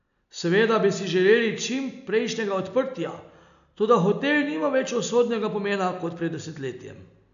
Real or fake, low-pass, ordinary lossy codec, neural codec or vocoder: real; 7.2 kHz; none; none